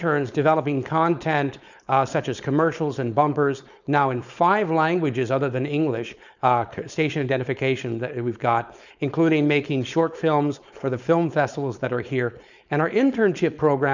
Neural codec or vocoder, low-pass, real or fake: codec, 16 kHz, 4.8 kbps, FACodec; 7.2 kHz; fake